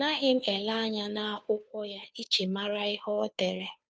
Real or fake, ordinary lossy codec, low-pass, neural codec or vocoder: fake; none; none; codec, 16 kHz, 2 kbps, FunCodec, trained on Chinese and English, 25 frames a second